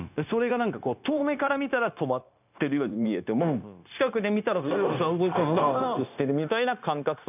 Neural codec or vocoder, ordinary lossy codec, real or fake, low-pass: codec, 16 kHz, 0.9 kbps, LongCat-Audio-Codec; none; fake; 3.6 kHz